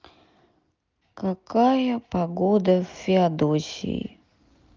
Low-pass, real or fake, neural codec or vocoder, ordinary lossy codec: 7.2 kHz; real; none; Opus, 32 kbps